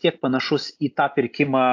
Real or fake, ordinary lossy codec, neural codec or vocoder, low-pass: real; AAC, 48 kbps; none; 7.2 kHz